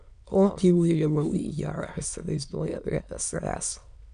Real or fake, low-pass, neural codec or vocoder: fake; 9.9 kHz; autoencoder, 22.05 kHz, a latent of 192 numbers a frame, VITS, trained on many speakers